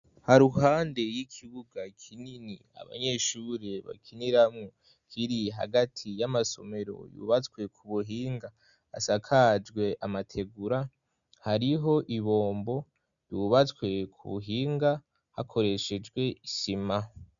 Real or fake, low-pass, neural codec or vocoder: real; 7.2 kHz; none